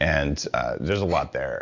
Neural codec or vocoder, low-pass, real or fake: none; 7.2 kHz; real